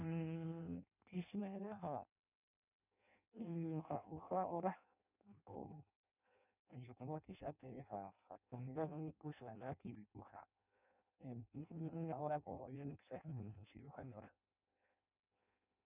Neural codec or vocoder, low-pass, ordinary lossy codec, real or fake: codec, 16 kHz in and 24 kHz out, 0.6 kbps, FireRedTTS-2 codec; 3.6 kHz; none; fake